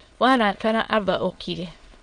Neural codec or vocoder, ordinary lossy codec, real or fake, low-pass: autoencoder, 22.05 kHz, a latent of 192 numbers a frame, VITS, trained on many speakers; MP3, 64 kbps; fake; 9.9 kHz